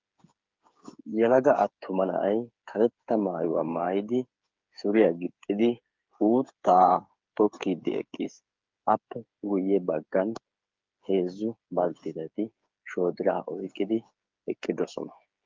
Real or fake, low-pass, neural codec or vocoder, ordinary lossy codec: fake; 7.2 kHz; codec, 16 kHz, 8 kbps, FreqCodec, smaller model; Opus, 32 kbps